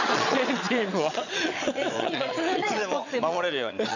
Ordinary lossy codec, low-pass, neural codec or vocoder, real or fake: none; 7.2 kHz; vocoder, 22.05 kHz, 80 mel bands, Vocos; fake